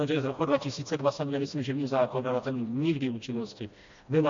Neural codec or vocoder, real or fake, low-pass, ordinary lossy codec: codec, 16 kHz, 1 kbps, FreqCodec, smaller model; fake; 7.2 kHz; MP3, 48 kbps